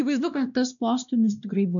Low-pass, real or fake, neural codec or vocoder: 7.2 kHz; fake; codec, 16 kHz, 1 kbps, X-Codec, WavLM features, trained on Multilingual LibriSpeech